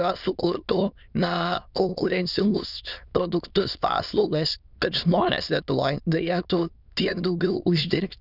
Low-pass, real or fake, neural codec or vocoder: 5.4 kHz; fake; autoencoder, 22.05 kHz, a latent of 192 numbers a frame, VITS, trained on many speakers